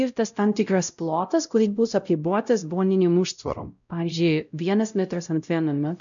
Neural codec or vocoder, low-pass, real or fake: codec, 16 kHz, 0.5 kbps, X-Codec, WavLM features, trained on Multilingual LibriSpeech; 7.2 kHz; fake